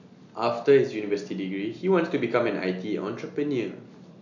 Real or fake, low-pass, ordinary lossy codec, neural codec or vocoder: real; 7.2 kHz; none; none